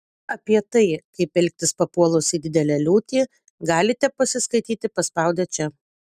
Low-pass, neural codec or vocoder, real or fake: 14.4 kHz; none; real